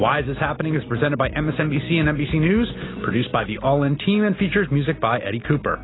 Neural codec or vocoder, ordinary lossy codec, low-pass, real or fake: none; AAC, 16 kbps; 7.2 kHz; real